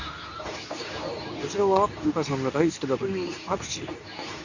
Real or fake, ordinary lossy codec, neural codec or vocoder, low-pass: fake; none; codec, 24 kHz, 0.9 kbps, WavTokenizer, medium speech release version 2; 7.2 kHz